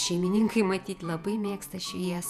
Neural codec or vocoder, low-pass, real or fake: vocoder, 48 kHz, 128 mel bands, Vocos; 14.4 kHz; fake